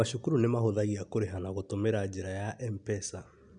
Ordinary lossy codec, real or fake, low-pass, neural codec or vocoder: none; real; 9.9 kHz; none